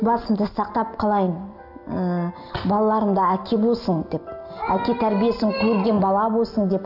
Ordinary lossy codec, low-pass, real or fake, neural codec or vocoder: none; 5.4 kHz; real; none